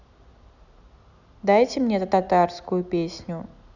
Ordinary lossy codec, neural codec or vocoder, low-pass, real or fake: none; none; 7.2 kHz; real